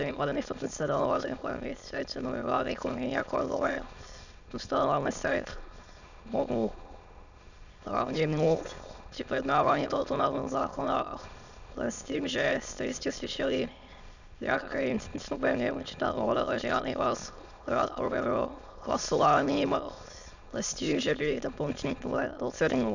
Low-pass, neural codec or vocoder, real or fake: 7.2 kHz; autoencoder, 22.05 kHz, a latent of 192 numbers a frame, VITS, trained on many speakers; fake